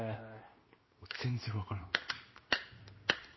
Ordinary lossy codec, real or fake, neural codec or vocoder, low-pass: MP3, 24 kbps; fake; codec, 16 kHz in and 24 kHz out, 1 kbps, XY-Tokenizer; 7.2 kHz